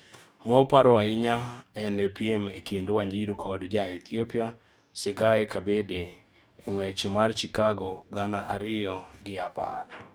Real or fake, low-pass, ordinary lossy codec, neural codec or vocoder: fake; none; none; codec, 44.1 kHz, 2.6 kbps, DAC